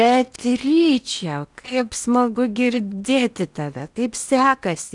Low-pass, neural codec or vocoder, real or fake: 10.8 kHz; codec, 16 kHz in and 24 kHz out, 0.8 kbps, FocalCodec, streaming, 65536 codes; fake